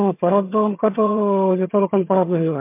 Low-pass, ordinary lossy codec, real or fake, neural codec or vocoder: 3.6 kHz; MP3, 32 kbps; fake; vocoder, 22.05 kHz, 80 mel bands, HiFi-GAN